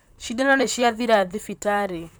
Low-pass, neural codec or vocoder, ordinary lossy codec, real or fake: none; vocoder, 44.1 kHz, 128 mel bands, Pupu-Vocoder; none; fake